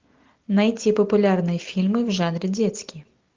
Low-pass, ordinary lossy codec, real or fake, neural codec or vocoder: 7.2 kHz; Opus, 32 kbps; real; none